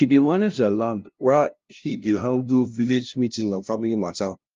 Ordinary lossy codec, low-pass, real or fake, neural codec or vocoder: Opus, 32 kbps; 7.2 kHz; fake; codec, 16 kHz, 0.5 kbps, FunCodec, trained on LibriTTS, 25 frames a second